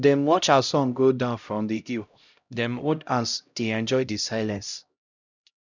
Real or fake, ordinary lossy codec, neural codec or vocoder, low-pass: fake; none; codec, 16 kHz, 0.5 kbps, X-Codec, HuBERT features, trained on LibriSpeech; 7.2 kHz